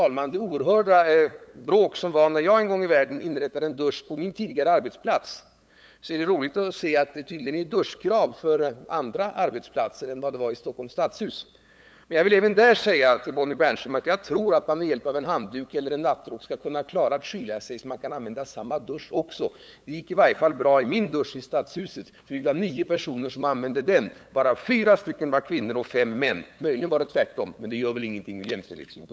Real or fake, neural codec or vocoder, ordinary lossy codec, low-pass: fake; codec, 16 kHz, 8 kbps, FunCodec, trained on LibriTTS, 25 frames a second; none; none